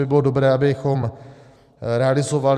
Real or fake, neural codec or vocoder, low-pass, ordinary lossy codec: fake; vocoder, 44.1 kHz, 128 mel bands every 256 samples, BigVGAN v2; 14.4 kHz; Opus, 64 kbps